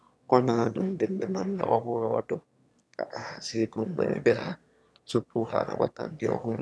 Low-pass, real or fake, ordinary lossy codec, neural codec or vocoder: none; fake; none; autoencoder, 22.05 kHz, a latent of 192 numbers a frame, VITS, trained on one speaker